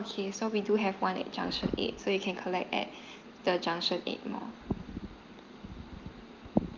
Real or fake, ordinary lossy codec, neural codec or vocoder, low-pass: real; Opus, 24 kbps; none; 7.2 kHz